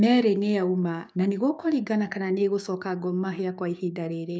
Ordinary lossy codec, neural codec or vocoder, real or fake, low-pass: none; codec, 16 kHz, 6 kbps, DAC; fake; none